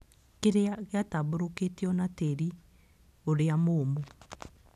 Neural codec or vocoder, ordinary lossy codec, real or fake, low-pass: none; none; real; 14.4 kHz